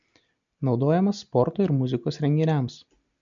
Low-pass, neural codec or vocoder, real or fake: 7.2 kHz; none; real